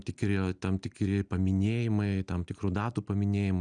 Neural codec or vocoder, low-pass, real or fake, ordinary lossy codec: none; 9.9 kHz; real; Opus, 64 kbps